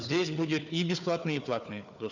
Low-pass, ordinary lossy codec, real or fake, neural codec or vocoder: 7.2 kHz; none; fake; codec, 16 kHz, 4 kbps, FunCodec, trained on Chinese and English, 50 frames a second